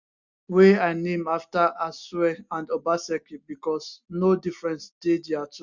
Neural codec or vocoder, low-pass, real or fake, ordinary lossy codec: none; 7.2 kHz; real; Opus, 64 kbps